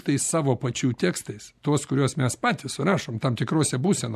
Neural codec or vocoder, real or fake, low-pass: none; real; 14.4 kHz